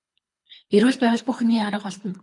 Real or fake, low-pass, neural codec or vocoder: fake; 10.8 kHz; codec, 24 kHz, 3 kbps, HILCodec